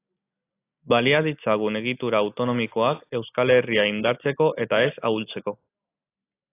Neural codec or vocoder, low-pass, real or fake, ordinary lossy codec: none; 3.6 kHz; real; AAC, 24 kbps